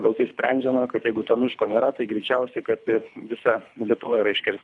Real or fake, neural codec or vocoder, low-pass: fake; codec, 24 kHz, 3 kbps, HILCodec; 10.8 kHz